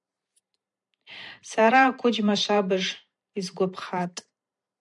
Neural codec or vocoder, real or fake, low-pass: vocoder, 44.1 kHz, 128 mel bands every 512 samples, BigVGAN v2; fake; 10.8 kHz